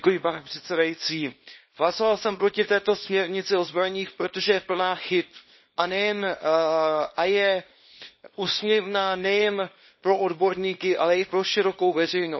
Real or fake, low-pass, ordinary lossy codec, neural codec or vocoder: fake; 7.2 kHz; MP3, 24 kbps; codec, 24 kHz, 0.9 kbps, WavTokenizer, small release